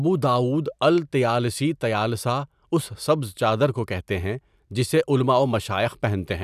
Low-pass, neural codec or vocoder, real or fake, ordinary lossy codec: 14.4 kHz; vocoder, 44.1 kHz, 128 mel bands every 256 samples, BigVGAN v2; fake; none